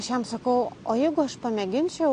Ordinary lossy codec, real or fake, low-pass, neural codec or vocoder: AAC, 48 kbps; real; 9.9 kHz; none